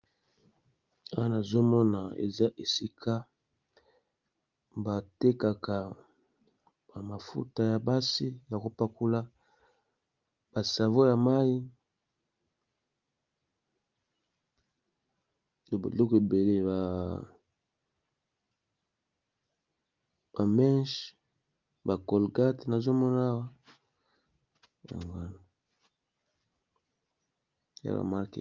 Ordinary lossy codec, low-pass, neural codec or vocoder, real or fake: Opus, 24 kbps; 7.2 kHz; none; real